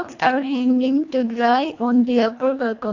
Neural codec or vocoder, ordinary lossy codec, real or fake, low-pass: codec, 24 kHz, 1.5 kbps, HILCodec; AAC, 48 kbps; fake; 7.2 kHz